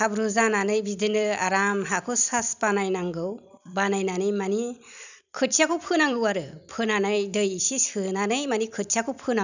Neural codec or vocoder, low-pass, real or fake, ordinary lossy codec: vocoder, 44.1 kHz, 128 mel bands every 256 samples, BigVGAN v2; 7.2 kHz; fake; none